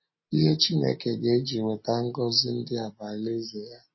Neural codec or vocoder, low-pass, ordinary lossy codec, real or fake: none; 7.2 kHz; MP3, 24 kbps; real